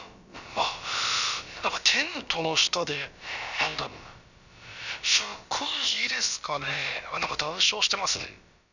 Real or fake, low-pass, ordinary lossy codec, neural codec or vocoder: fake; 7.2 kHz; none; codec, 16 kHz, about 1 kbps, DyCAST, with the encoder's durations